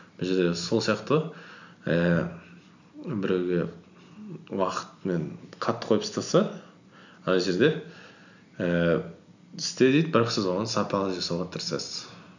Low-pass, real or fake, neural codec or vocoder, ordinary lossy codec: 7.2 kHz; real; none; none